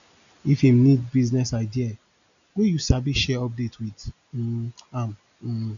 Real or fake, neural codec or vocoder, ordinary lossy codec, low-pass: real; none; none; 7.2 kHz